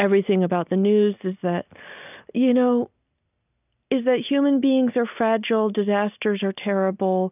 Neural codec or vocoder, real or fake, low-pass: none; real; 3.6 kHz